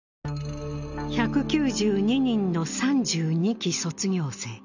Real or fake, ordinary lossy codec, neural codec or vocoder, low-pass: real; none; none; 7.2 kHz